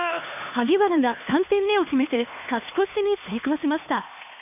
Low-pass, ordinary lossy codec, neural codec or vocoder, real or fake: 3.6 kHz; none; codec, 16 kHz, 2 kbps, X-Codec, HuBERT features, trained on LibriSpeech; fake